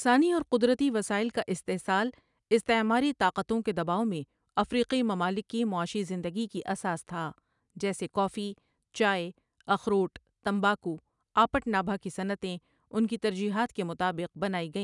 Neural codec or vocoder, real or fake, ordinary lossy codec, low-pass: none; real; none; 10.8 kHz